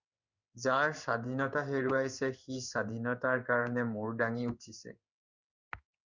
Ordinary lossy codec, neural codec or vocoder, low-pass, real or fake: Opus, 64 kbps; codec, 16 kHz in and 24 kHz out, 1 kbps, XY-Tokenizer; 7.2 kHz; fake